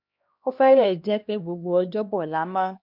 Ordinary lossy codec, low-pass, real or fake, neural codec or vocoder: none; 5.4 kHz; fake; codec, 16 kHz, 1 kbps, X-Codec, HuBERT features, trained on LibriSpeech